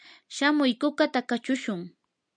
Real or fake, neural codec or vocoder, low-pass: real; none; 9.9 kHz